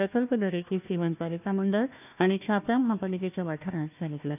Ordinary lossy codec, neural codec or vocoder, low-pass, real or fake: none; codec, 16 kHz, 1 kbps, FunCodec, trained on Chinese and English, 50 frames a second; 3.6 kHz; fake